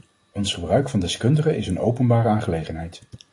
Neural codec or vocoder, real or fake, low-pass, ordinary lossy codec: none; real; 10.8 kHz; AAC, 48 kbps